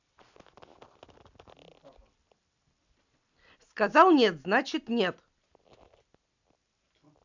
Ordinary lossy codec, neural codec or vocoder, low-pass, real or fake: none; none; 7.2 kHz; real